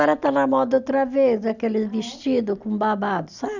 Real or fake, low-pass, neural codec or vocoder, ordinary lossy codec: real; 7.2 kHz; none; none